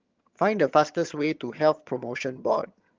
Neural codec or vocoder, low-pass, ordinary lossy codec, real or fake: vocoder, 22.05 kHz, 80 mel bands, HiFi-GAN; 7.2 kHz; Opus, 32 kbps; fake